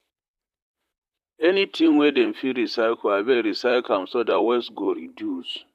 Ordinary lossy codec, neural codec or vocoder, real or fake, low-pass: AAC, 96 kbps; vocoder, 44.1 kHz, 128 mel bands, Pupu-Vocoder; fake; 14.4 kHz